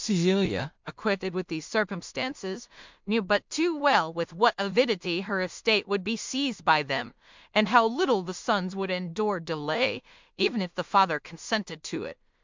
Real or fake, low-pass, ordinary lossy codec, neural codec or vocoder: fake; 7.2 kHz; MP3, 64 kbps; codec, 16 kHz in and 24 kHz out, 0.4 kbps, LongCat-Audio-Codec, two codebook decoder